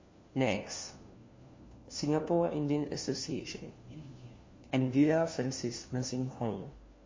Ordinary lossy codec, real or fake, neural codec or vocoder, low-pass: MP3, 32 kbps; fake; codec, 16 kHz, 1 kbps, FunCodec, trained on LibriTTS, 50 frames a second; 7.2 kHz